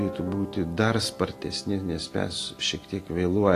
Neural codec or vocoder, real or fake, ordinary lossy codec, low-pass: none; real; AAC, 48 kbps; 14.4 kHz